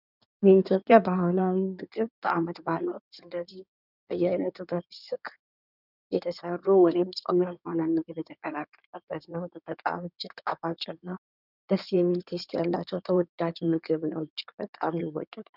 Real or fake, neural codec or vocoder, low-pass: fake; codec, 16 kHz in and 24 kHz out, 2.2 kbps, FireRedTTS-2 codec; 5.4 kHz